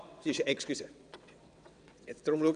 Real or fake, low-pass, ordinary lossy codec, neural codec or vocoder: fake; 9.9 kHz; none; vocoder, 22.05 kHz, 80 mel bands, WaveNeXt